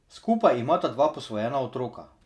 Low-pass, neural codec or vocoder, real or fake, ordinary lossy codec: none; none; real; none